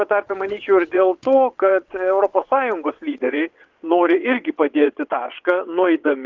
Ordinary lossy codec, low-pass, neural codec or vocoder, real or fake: Opus, 32 kbps; 7.2 kHz; vocoder, 22.05 kHz, 80 mel bands, WaveNeXt; fake